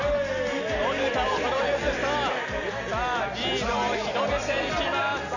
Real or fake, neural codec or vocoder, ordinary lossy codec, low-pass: real; none; none; 7.2 kHz